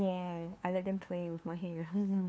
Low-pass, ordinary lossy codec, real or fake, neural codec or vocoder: none; none; fake; codec, 16 kHz, 2 kbps, FunCodec, trained on LibriTTS, 25 frames a second